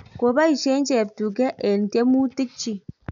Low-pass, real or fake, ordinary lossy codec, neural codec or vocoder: 7.2 kHz; real; none; none